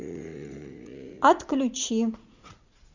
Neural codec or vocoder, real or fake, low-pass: vocoder, 44.1 kHz, 80 mel bands, Vocos; fake; 7.2 kHz